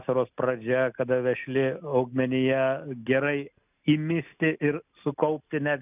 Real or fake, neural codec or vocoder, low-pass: real; none; 3.6 kHz